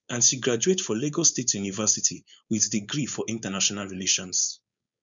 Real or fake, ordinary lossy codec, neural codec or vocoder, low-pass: fake; none; codec, 16 kHz, 4.8 kbps, FACodec; 7.2 kHz